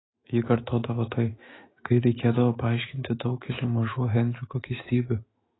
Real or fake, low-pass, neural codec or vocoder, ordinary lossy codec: fake; 7.2 kHz; autoencoder, 48 kHz, 128 numbers a frame, DAC-VAE, trained on Japanese speech; AAC, 16 kbps